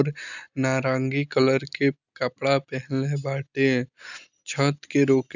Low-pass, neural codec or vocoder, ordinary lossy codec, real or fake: 7.2 kHz; none; none; real